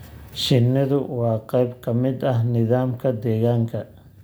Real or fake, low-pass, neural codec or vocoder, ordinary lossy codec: real; none; none; none